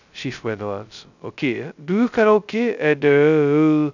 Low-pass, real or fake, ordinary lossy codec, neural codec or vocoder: 7.2 kHz; fake; none; codec, 16 kHz, 0.2 kbps, FocalCodec